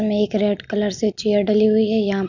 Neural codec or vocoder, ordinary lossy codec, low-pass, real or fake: none; none; 7.2 kHz; real